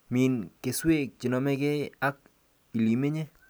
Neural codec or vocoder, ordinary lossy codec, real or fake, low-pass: none; none; real; none